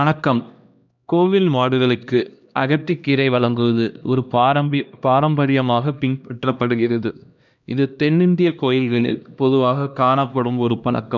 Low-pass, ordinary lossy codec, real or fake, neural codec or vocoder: 7.2 kHz; none; fake; codec, 16 kHz, 1 kbps, X-Codec, HuBERT features, trained on LibriSpeech